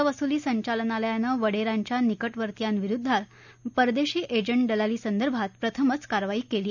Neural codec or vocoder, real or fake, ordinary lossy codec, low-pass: none; real; none; 7.2 kHz